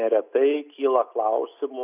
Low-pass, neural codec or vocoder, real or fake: 3.6 kHz; none; real